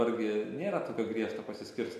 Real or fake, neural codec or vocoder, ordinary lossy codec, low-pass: real; none; MP3, 64 kbps; 14.4 kHz